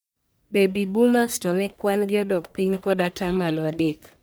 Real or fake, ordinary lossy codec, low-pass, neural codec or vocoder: fake; none; none; codec, 44.1 kHz, 1.7 kbps, Pupu-Codec